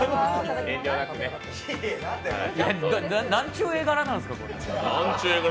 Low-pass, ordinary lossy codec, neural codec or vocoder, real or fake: none; none; none; real